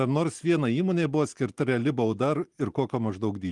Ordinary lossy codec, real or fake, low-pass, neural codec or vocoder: Opus, 24 kbps; real; 10.8 kHz; none